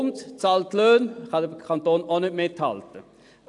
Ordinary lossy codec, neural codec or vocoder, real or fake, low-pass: AAC, 64 kbps; none; real; 10.8 kHz